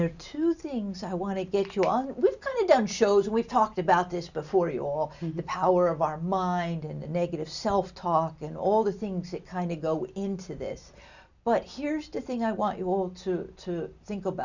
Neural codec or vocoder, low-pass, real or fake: none; 7.2 kHz; real